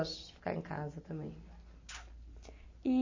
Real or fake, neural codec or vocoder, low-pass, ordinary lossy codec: real; none; 7.2 kHz; MP3, 32 kbps